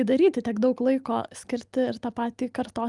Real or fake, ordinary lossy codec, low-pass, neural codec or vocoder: real; Opus, 32 kbps; 10.8 kHz; none